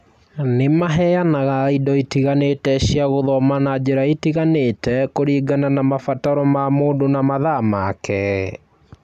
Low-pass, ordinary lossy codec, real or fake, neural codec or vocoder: 14.4 kHz; none; real; none